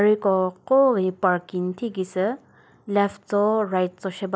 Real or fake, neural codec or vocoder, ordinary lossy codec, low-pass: real; none; none; none